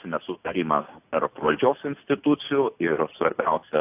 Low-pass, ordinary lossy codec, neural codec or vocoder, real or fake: 3.6 kHz; AAC, 32 kbps; none; real